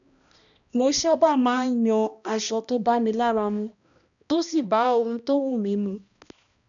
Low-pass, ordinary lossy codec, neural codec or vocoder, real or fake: 7.2 kHz; MP3, 96 kbps; codec, 16 kHz, 1 kbps, X-Codec, HuBERT features, trained on balanced general audio; fake